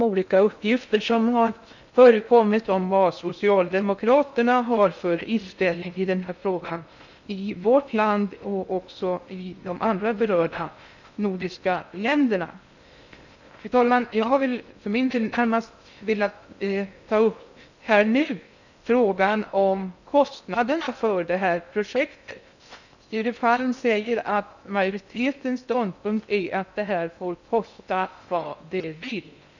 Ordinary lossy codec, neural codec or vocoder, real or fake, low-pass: none; codec, 16 kHz in and 24 kHz out, 0.6 kbps, FocalCodec, streaming, 2048 codes; fake; 7.2 kHz